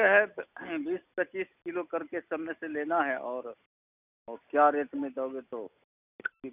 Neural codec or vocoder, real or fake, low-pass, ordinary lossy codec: none; real; 3.6 kHz; none